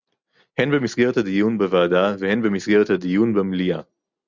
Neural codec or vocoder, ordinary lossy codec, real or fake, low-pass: none; Opus, 64 kbps; real; 7.2 kHz